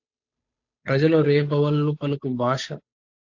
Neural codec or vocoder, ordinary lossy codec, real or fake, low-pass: codec, 16 kHz, 8 kbps, FunCodec, trained on Chinese and English, 25 frames a second; AAC, 48 kbps; fake; 7.2 kHz